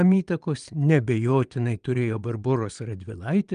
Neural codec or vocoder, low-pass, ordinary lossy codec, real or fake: vocoder, 22.05 kHz, 80 mel bands, Vocos; 9.9 kHz; Opus, 32 kbps; fake